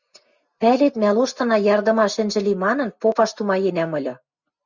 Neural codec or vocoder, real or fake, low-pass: none; real; 7.2 kHz